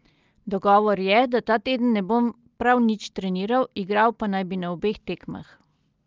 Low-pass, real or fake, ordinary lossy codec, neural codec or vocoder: 7.2 kHz; real; Opus, 24 kbps; none